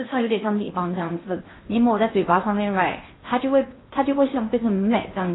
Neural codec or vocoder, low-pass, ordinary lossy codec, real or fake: codec, 16 kHz in and 24 kHz out, 0.8 kbps, FocalCodec, streaming, 65536 codes; 7.2 kHz; AAC, 16 kbps; fake